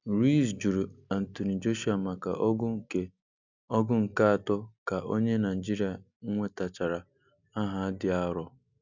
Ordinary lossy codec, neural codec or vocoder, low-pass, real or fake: none; none; 7.2 kHz; real